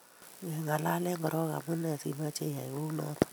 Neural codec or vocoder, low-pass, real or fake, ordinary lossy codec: none; none; real; none